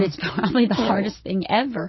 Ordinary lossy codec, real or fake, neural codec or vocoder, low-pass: MP3, 24 kbps; real; none; 7.2 kHz